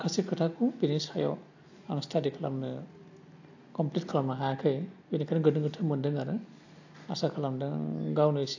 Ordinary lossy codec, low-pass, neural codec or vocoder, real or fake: AAC, 48 kbps; 7.2 kHz; none; real